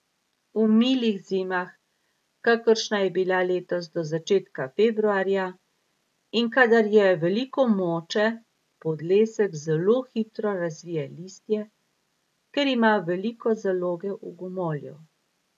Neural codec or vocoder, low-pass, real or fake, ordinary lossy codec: none; 14.4 kHz; real; none